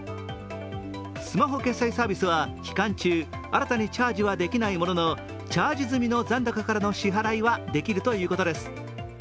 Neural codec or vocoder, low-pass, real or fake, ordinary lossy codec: none; none; real; none